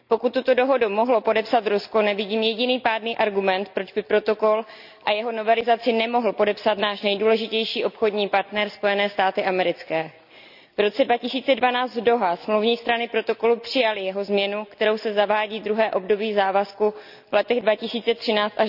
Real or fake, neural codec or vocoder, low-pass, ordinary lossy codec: real; none; 5.4 kHz; none